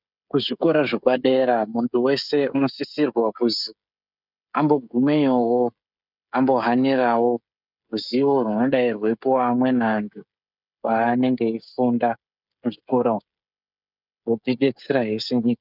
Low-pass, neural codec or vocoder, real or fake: 5.4 kHz; codec, 16 kHz, 16 kbps, FreqCodec, smaller model; fake